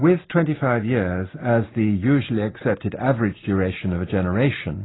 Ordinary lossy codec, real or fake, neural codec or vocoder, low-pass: AAC, 16 kbps; real; none; 7.2 kHz